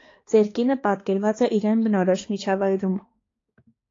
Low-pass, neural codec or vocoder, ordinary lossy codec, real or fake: 7.2 kHz; codec, 16 kHz, 2 kbps, X-Codec, HuBERT features, trained on balanced general audio; AAC, 32 kbps; fake